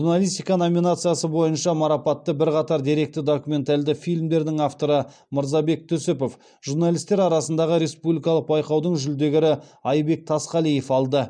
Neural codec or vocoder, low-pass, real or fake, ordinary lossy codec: none; 9.9 kHz; real; none